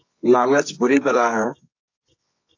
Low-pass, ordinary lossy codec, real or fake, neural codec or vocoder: 7.2 kHz; AAC, 48 kbps; fake; codec, 24 kHz, 0.9 kbps, WavTokenizer, medium music audio release